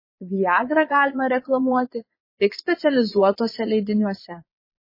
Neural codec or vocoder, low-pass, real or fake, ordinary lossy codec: vocoder, 22.05 kHz, 80 mel bands, Vocos; 5.4 kHz; fake; MP3, 24 kbps